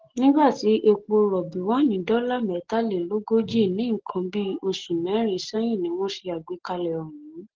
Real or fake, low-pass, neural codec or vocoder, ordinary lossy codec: real; 7.2 kHz; none; Opus, 16 kbps